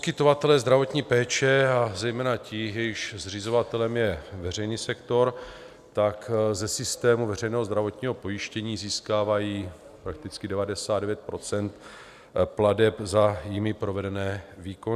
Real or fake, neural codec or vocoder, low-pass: real; none; 14.4 kHz